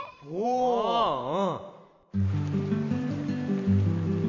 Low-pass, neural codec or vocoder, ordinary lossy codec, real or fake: 7.2 kHz; vocoder, 44.1 kHz, 128 mel bands every 512 samples, BigVGAN v2; none; fake